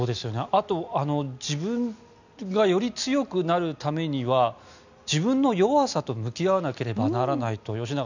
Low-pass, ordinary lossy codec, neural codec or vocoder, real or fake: 7.2 kHz; none; none; real